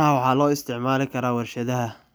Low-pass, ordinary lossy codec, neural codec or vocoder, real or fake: none; none; none; real